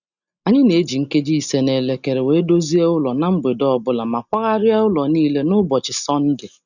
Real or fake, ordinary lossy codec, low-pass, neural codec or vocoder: real; none; 7.2 kHz; none